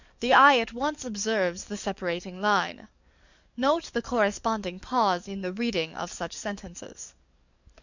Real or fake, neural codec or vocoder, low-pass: fake; codec, 44.1 kHz, 7.8 kbps, DAC; 7.2 kHz